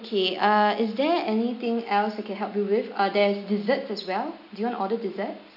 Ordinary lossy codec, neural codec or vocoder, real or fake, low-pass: none; none; real; 5.4 kHz